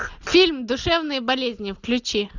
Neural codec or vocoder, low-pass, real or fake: none; 7.2 kHz; real